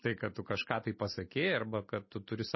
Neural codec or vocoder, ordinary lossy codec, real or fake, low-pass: none; MP3, 24 kbps; real; 7.2 kHz